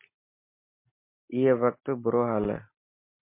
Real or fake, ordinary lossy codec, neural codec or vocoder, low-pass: real; MP3, 24 kbps; none; 3.6 kHz